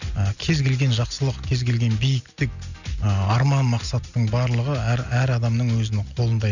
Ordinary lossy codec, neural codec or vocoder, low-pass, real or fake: none; none; 7.2 kHz; real